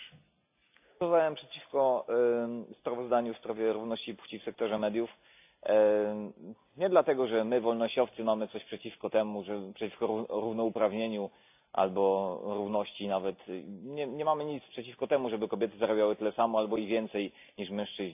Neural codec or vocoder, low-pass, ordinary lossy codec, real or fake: none; 3.6 kHz; AAC, 32 kbps; real